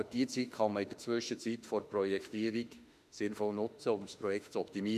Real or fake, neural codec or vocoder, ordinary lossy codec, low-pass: fake; autoencoder, 48 kHz, 32 numbers a frame, DAC-VAE, trained on Japanese speech; none; 14.4 kHz